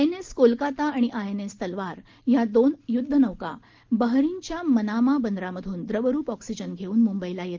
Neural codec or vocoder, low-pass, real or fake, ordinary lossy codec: none; 7.2 kHz; real; Opus, 16 kbps